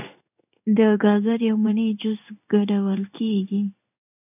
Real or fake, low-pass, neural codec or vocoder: fake; 3.6 kHz; codec, 16 kHz in and 24 kHz out, 1 kbps, XY-Tokenizer